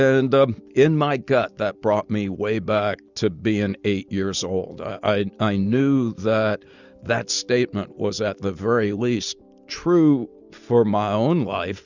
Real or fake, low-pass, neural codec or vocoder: real; 7.2 kHz; none